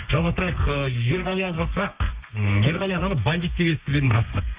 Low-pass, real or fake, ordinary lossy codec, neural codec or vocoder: 3.6 kHz; fake; Opus, 64 kbps; codec, 32 kHz, 1.9 kbps, SNAC